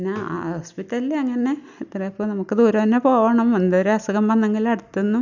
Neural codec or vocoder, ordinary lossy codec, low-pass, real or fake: none; none; 7.2 kHz; real